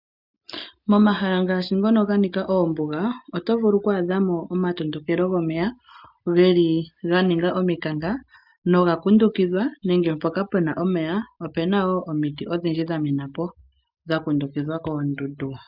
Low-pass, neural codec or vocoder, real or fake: 5.4 kHz; none; real